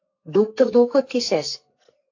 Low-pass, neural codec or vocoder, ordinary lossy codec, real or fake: 7.2 kHz; codec, 44.1 kHz, 2.6 kbps, SNAC; AAC, 48 kbps; fake